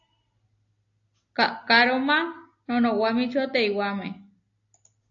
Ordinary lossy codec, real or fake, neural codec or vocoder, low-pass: AAC, 48 kbps; real; none; 7.2 kHz